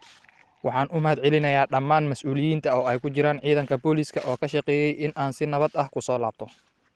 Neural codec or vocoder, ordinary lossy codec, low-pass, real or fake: none; Opus, 16 kbps; 10.8 kHz; real